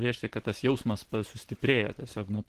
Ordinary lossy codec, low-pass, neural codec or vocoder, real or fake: Opus, 16 kbps; 14.4 kHz; vocoder, 44.1 kHz, 128 mel bands every 512 samples, BigVGAN v2; fake